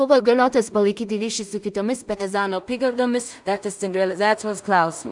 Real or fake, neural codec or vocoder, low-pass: fake; codec, 16 kHz in and 24 kHz out, 0.4 kbps, LongCat-Audio-Codec, two codebook decoder; 10.8 kHz